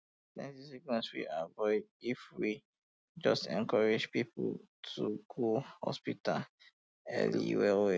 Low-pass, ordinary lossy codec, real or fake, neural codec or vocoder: none; none; real; none